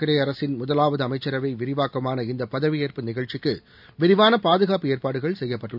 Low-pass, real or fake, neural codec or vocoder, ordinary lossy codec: 5.4 kHz; real; none; none